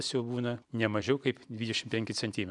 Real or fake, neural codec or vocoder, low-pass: real; none; 10.8 kHz